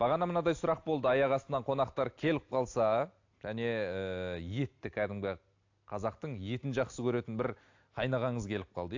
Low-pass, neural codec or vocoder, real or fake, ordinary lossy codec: 7.2 kHz; none; real; AAC, 48 kbps